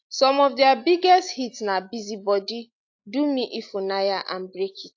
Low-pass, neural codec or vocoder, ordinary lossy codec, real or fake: 7.2 kHz; none; AAC, 48 kbps; real